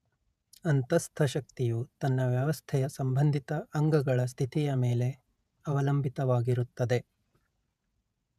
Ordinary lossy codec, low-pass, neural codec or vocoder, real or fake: none; 14.4 kHz; vocoder, 48 kHz, 128 mel bands, Vocos; fake